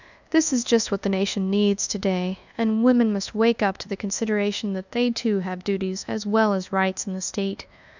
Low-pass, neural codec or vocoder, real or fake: 7.2 kHz; codec, 24 kHz, 1.2 kbps, DualCodec; fake